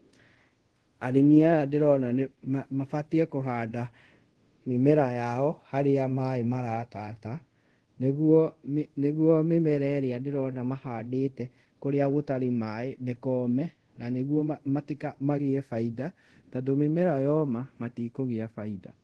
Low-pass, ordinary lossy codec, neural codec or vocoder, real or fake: 10.8 kHz; Opus, 16 kbps; codec, 24 kHz, 0.5 kbps, DualCodec; fake